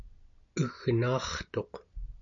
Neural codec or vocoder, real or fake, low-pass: none; real; 7.2 kHz